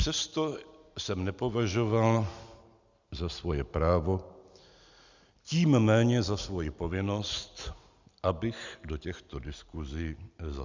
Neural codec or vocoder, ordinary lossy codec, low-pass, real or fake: none; Opus, 64 kbps; 7.2 kHz; real